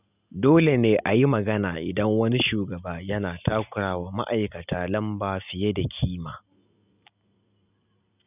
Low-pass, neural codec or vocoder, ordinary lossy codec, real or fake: 3.6 kHz; none; none; real